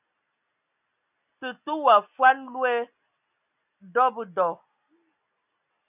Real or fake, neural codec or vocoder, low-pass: real; none; 3.6 kHz